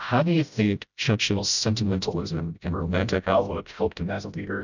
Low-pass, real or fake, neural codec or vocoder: 7.2 kHz; fake; codec, 16 kHz, 0.5 kbps, FreqCodec, smaller model